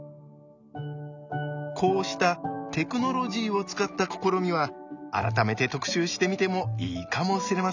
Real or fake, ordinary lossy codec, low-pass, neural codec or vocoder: real; none; 7.2 kHz; none